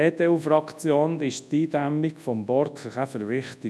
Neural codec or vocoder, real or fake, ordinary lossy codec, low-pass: codec, 24 kHz, 0.9 kbps, WavTokenizer, large speech release; fake; none; none